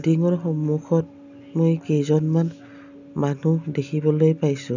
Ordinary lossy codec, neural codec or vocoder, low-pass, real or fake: none; none; 7.2 kHz; real